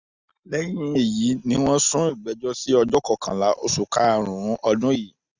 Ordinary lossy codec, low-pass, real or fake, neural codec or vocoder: Opus, 64 kbps; 7.2 kHz; real; none